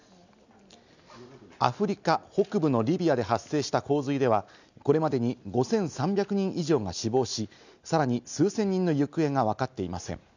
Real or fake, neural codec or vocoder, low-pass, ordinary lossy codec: real; none; 7.2 kHz; none